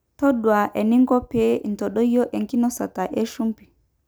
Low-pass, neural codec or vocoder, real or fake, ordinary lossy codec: none; none; real; none